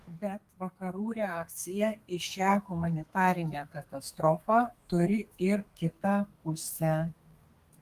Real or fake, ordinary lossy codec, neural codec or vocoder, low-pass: fake; Opus, 32 kbps; codec, 32 kHz, 1.9 kbps, SNAC; 14.4 kHz